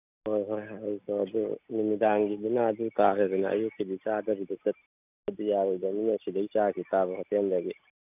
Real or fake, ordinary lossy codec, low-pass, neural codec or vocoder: real; none; 3.6 kHz; none